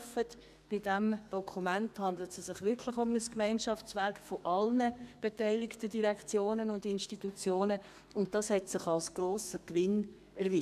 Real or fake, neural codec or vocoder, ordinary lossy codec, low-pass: fake; codec, 32 kHz, 1.9 kbps, SNAC; none; 14.4 kHz